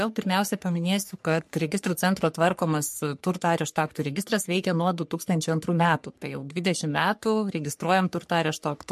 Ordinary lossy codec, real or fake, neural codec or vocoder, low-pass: MP3, 64 kbps; fake; codec, 44.1 kHz, 3.4 kbps, Pupu-Codec; 14.4 kHz